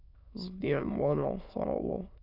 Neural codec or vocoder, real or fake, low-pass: autoencoder, 22.05 kHz, a latent of 192 numbers a frame, VITS, trained on many speakers; fake; 5.4 kHz